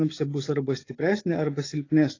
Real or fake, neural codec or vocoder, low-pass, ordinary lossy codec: real; none; 7.2 kHz; AAC, 32 kbps